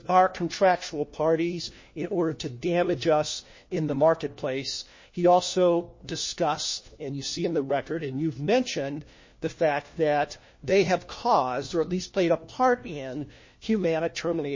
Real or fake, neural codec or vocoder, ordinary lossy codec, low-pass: fake; codec, 16 kHz, 1 kbps, FunCodec, trained on LibriTTS, 50 frames a second; MP3, 32 kbps; 7.2 kHz